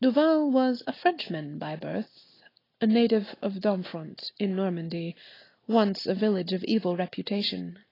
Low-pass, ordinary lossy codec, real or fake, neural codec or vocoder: 5.4 kHz; AAC, 24 kbps; real; none